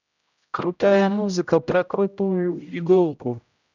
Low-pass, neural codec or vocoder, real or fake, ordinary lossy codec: 7.2 kHz; codec, 16 kHz, 0.5 kbps, X-Codec, HuBERT features, trained on general audio; fake; none